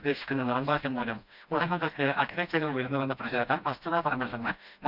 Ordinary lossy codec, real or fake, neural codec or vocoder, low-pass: none; fake; codec, 16 kHz, 1 kbps, FreqCodec, smaller model; 5.4 kHz